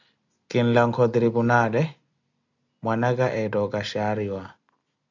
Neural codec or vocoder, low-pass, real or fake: none; 7.2 kHz; real